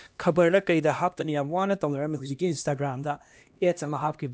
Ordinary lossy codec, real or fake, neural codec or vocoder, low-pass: none; fake; codec, 16 kHz, 1 kbps, X-Codec, HuBERT features, trained on LibriSpeech; none